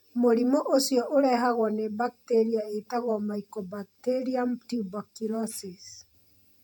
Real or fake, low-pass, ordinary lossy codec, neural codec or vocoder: fake; 19.8 kHz; none; vocoder, 48 kHz, 128 mel bands, Vocos